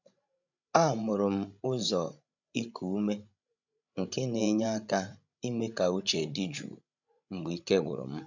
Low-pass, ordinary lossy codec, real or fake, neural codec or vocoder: 7.2 kHz; none; fake; codec, 16 kHz, 16 kbps, FreqCodec, larger model